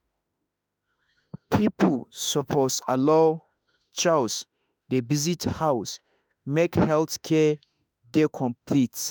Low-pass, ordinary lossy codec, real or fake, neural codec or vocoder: none; none; fake; autoencoder, 48 kHz, 32 numbers a frame, DAC-VAE, trained on Japanese speech